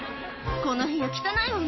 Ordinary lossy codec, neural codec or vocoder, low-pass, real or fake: MP3, 24 kbps; none; 7.2 kHz; real